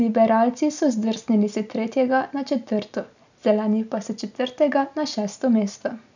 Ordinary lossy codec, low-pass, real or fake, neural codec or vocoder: none; 7.2 kHz; real; none